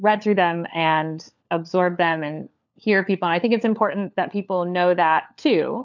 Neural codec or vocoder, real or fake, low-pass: codec, 16 kHz, 4 kbps, FunCodec, trained on LibriTTS, 50 frames a second; fake; 7.2 kHz